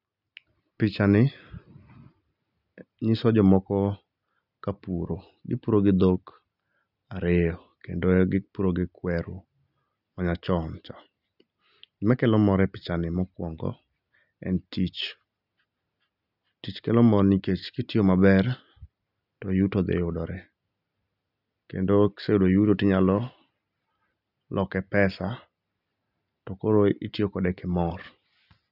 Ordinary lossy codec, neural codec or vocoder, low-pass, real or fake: none; none; 5.4 kHz; real